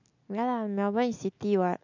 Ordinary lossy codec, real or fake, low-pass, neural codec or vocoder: none; real; 7.2 kHz; none